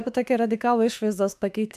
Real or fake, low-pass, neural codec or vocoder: fake; 14.4 kHz; autoencoder, 48 kHz, 32 numbers a frame, DAC-VAE, trained on Japanese speech